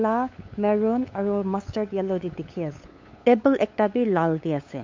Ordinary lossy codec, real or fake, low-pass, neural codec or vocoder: MP3, 48 kbps; fake; 7.2 kHz; codec, 16 kHz, 8 kbps, FunCodec, trained on LibriTTS, 25 frames a second